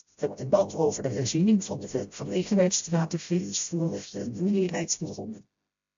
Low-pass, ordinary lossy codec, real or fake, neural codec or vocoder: 7.2 kHz; AAC, 64 kbps; fake; codec, 16 kHz, 0.5 kbps, FreqCodec, smaller model